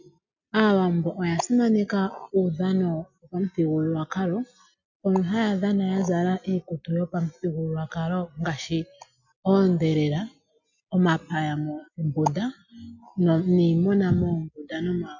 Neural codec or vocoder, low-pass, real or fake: none; 7.2 kHz; real